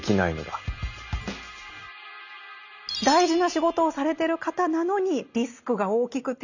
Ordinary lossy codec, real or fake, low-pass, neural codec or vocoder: none; real; 7.2 kHz; none